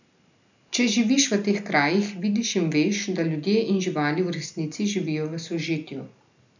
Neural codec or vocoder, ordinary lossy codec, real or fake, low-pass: none; none; real; 7.2 kHz